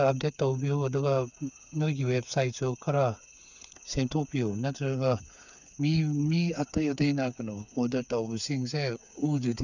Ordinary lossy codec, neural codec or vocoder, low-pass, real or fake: none; codec, 16 kHz, 8 kbps, FreqCodec, smaller model; 7.2 kHz; fake